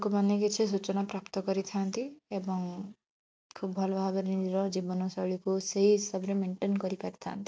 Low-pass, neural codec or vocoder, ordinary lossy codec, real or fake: none; none; none; real